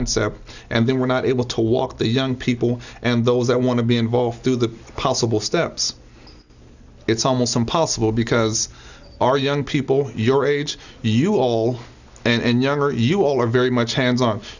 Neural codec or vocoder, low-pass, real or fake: none; 7.2 kHz; real